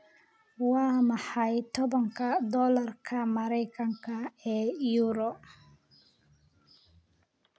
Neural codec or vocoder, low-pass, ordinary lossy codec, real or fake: none; none; none; real